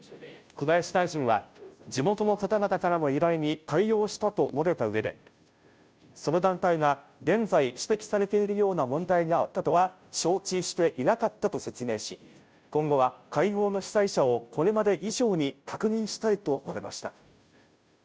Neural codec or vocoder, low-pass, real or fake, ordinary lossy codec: codec, 16 kHz, 0.5 kbps, FunCodec, trained on Chinese and English, 25 frames a second; none; fake; none